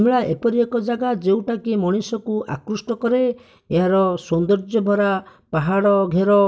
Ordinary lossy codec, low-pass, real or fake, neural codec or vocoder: none; none; real; none